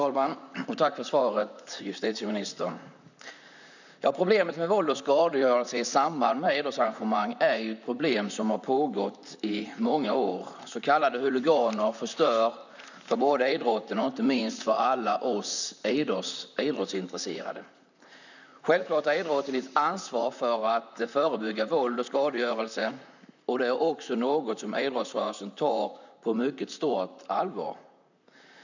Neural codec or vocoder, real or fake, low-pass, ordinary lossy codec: vocoder, 44.1 kHz, 128 mel bands, Pupu-Vocoder; fake; 7.2 kHz; none